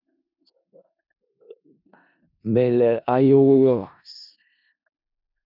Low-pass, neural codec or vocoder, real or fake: 5.4 kHz; codec, 16 kHz in and 24 kHz out, 0.4 kbps, LongCat-Audio-Codec, four codebook decoder; fake